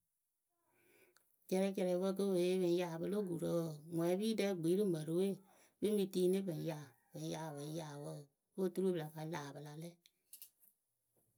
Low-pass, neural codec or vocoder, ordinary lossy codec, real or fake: none; none; none; real